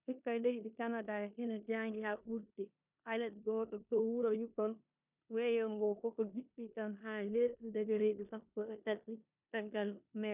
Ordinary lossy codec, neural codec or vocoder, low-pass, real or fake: none; codec, 16 kHz in and 24 kHz out, 0.9 kbps, LongCat-Audio-Codec, four codebook decoder; 3.6 kHz; fake